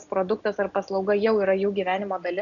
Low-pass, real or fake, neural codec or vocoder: 7.2 kHz; real; none